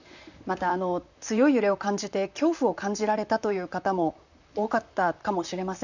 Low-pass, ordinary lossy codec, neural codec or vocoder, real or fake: 7.2 kHz; none; vocoder, 44.1 kHz, 128 mel bands, Pupu-Vocoder; fake